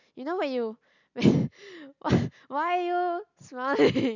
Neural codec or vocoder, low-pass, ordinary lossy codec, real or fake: none; 7.2 kHz; none; real